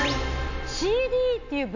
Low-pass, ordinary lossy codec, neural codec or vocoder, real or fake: 7.2 kHz; none; none; real